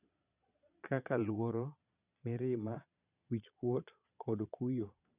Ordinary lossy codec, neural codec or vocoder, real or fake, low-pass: none; vocoder, 22.05 kHz, 80 mel bands, Vocos; fake; 3.6 kHz